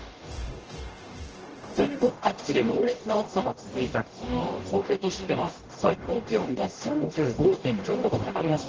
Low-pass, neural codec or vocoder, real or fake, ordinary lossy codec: 7.2 kHz; codec, 44.1 kHz, 0.9 kbps, DAC; fake; Opus, 16 kbps